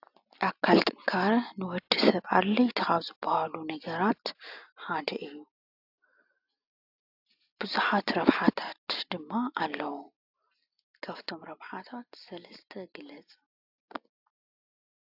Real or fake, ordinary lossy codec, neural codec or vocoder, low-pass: real; AAC, 48 kbps; none; 5.4 kHz